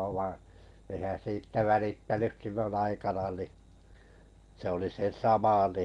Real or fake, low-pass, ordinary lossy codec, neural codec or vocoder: real; 10.8 kHz; Opus, 24 kbps; none